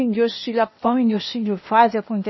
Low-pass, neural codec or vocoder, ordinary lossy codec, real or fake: 7.2 kHz; codec, 16 kHz, 0.8 kbps, ZipCodec; MP3, 24 kbps; fake